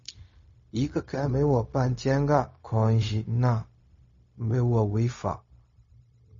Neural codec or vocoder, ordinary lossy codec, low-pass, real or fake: codec, 16 kHz, 0.4 kbps, LongCat-Audio-Codec; MP3, 32 kbps; 7.2 kHz; fake